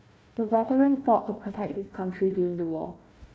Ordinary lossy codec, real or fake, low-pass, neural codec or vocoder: none; fake; none; codec, 16 kHz, 1 kbps, FunCodec, trained on Chinese and English, 50 frames a second